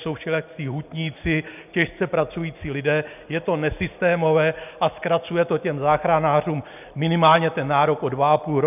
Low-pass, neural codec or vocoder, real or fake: 3.6 kHz; none; real